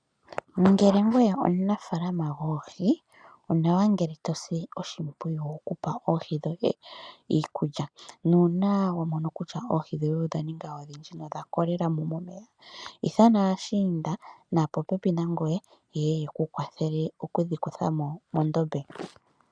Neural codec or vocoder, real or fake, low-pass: none; real; 9.9 kHz